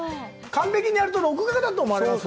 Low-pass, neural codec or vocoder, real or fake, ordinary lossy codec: none; none; real; none